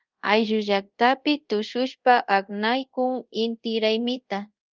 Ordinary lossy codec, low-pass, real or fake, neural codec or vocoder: Opus, 32 kbps; 7.2 kHz; fake; codec, 24 kHz, 0.5 kbps, DualCodec